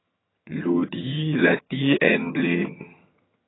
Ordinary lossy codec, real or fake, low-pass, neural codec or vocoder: AAC, 16 kbps; fake; 7.2 kHz; vocoder, 22.05 kHz, 80 mel bands, HiFi-GAN